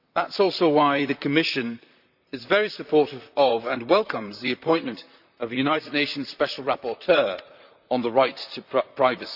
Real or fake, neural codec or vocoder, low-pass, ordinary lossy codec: fake; vocoder, 44.1 kHz, 128 mel bands, Pupu-Vocoder; 5.4 kHz; none